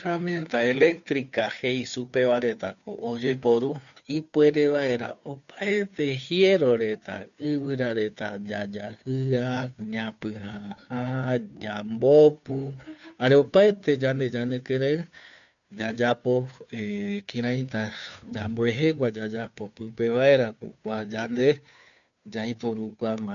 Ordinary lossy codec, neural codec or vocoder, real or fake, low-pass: none; codec, 16 kHz, 2 kbps, FunCodec, trained on Chinese and English, 25 frames a second; fake; 7.2 kHz